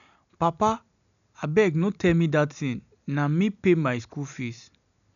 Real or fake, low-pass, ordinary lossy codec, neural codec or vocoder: real; 7.2 kHz; none; none